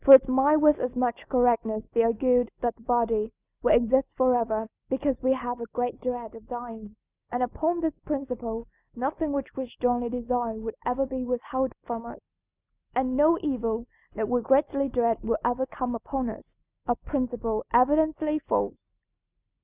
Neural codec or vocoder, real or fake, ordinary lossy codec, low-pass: none; real; Opus, 64 kbps; 3.6 kHz